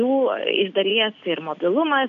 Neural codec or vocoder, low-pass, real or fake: none; 7.2 kHz; real